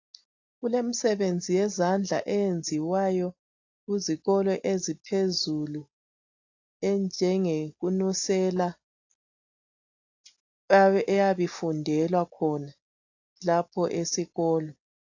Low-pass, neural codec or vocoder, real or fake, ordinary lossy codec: 7.2 kHz; none; real; AAC, 48 kbps